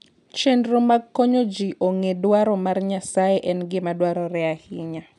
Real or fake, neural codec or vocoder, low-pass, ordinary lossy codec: real; none; 10.8 kHz; none